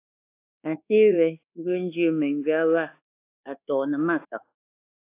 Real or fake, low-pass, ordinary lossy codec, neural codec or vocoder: fake; 3.6 kHz; AAC, 24 kbps; codec, 24 kHz, 1.2 kbps, DualCodec